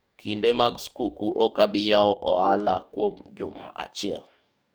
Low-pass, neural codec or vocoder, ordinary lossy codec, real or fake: none; codec, 44.1 kHz, 2.6 kbps, DAC; none; fake